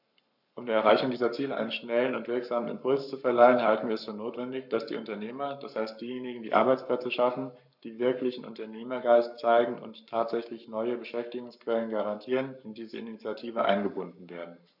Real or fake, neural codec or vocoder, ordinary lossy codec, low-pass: fake; codec, 44.1 kHz, 7.8 kbps, Pupu-Codec; none; 5.4 kHz